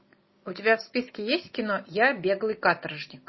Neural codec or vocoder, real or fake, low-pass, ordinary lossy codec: none; real; 7.2 kHz; MP3, 24 kbps